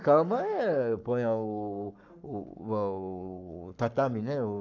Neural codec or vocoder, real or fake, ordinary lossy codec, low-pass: codec, 44.1 kHz, 7.8 kbps, Pupu-Codec; fake; none; 7.2 kHz